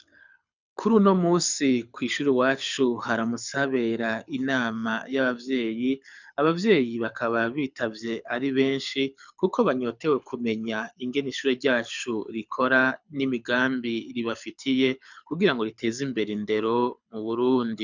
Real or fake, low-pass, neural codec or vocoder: fake; 7.2 kHz; codec, 24 kHz, 6 kbps, HILCodec